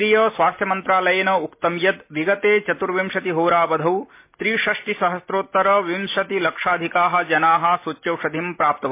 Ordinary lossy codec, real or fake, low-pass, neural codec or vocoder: MP3, 24 kbps; real; 3.6 kHz; none